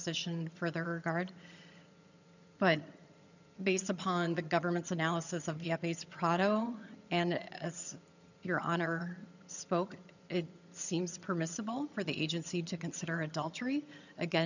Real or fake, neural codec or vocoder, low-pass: fake; vocoder, 22.05 kHz, 80 mel bands, HiFi-GAN; 7.2 kHz